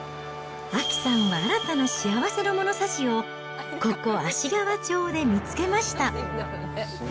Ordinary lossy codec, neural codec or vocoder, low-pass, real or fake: none; none; none; real